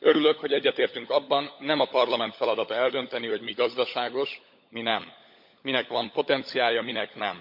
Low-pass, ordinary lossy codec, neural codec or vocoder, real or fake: 5.4 kHz; MP3, 48 kbps; codec, 16 kHz, 16 kbps, FunCodec, trained on LibriTTS, 50 frames a second; fake